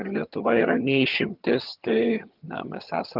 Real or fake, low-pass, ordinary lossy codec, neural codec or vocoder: fake; 5.4 kHz; Opus, 32 kbps; vocoder, 22.05 kHz, 80 mel bands, HiFi-GAN